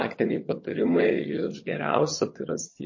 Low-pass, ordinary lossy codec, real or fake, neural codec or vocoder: 7.2 kHz; MP3, 32 kbps; fake; vocoder, 22.05 kHz, 80 mel bands, HiFi-GAN